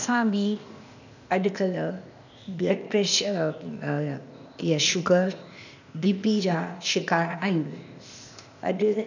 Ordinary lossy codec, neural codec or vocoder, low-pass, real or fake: none; codec, 16 kHz, 0.8 kbps, ZipCodec; 7.2 kHz; fake